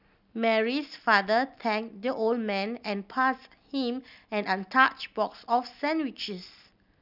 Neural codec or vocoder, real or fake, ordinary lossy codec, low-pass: none; real; none; 5.4 kHz